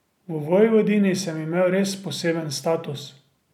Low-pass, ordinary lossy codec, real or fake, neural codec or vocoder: 19.8 kHz; none; real; none